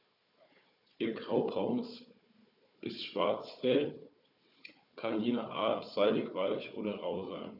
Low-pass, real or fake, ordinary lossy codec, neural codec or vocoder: 5.4 kHz; fake; none; codec, 16 kHz, 4 kbps, FunCodec, trained on Chinese and English, 50 frames a second